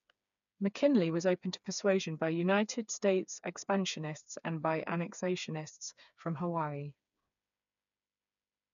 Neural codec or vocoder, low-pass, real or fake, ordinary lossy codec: codec, 16 kHz, 4 kbps, FreqCodec, smaller model; 7.2 kHz; fake; none